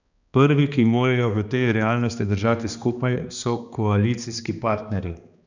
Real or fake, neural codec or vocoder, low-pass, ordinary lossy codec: fake; codec, 16 kHz, 2 kbps, X-Codec, HuBERT features, trained on balanced general audio; 7.2 kHz; none